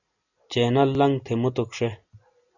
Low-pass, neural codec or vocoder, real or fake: 7.2 kHz; none; real